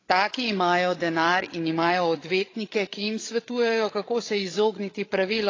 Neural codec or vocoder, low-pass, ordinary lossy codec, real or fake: codec, 16 kHz, 8 kbps, FreqCodec, larger model; 7.2 kHz; AAC, 32 kbps; fake